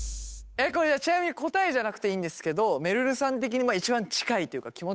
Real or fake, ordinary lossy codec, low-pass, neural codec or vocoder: fake; none; none; codec, 16 kHz, 8 kbps, FunCodec, trained on Chinese and English, 25 frames a second